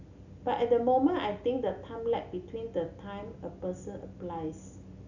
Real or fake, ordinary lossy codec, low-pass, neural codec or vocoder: real; none; 7.2 kHz; none